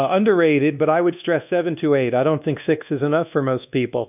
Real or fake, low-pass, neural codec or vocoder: fake; 3.6 kHz; codec, 24 kHz, 1.2 kbps, DualCodec